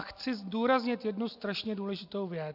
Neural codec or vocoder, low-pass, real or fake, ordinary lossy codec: none; 5.4 kHz; real; AAC, 48 kbps